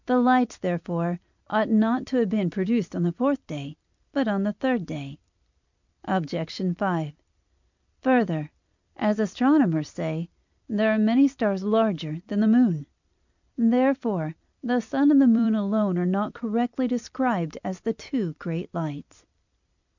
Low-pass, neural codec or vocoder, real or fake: 7.2 kHz; vocoder, 44.1 kHz, 128 mel bands every 256 samples, BigVGAN v2; fake